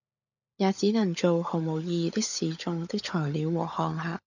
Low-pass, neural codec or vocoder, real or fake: 7.2 kHz; codec, 16 kHz, 16 kbps, FunCodec, trained on LibriTTS, 50 frames a second; fake